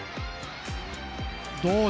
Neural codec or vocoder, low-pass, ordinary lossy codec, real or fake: none; none; none; real